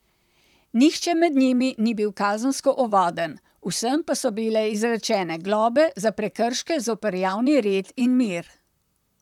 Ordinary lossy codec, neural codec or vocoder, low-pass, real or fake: none; vocoder, 44.1 kHz, 128 mel bands, Pupu-Vocoder; 19.8 kHz; fake